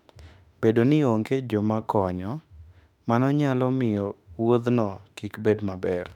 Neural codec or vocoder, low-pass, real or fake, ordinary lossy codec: autoencoder, 48 kHz, 32 numbers a frame, DAC-VAE, trained on Japanese speech; 19.8 kHz; fake; none